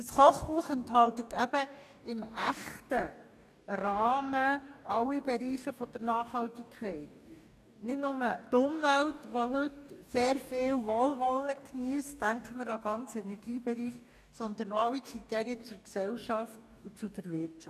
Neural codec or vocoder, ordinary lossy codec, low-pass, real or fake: codec, 44.1 kHz, 2.6 kbps, DAC; none; 14.4 kHz; fake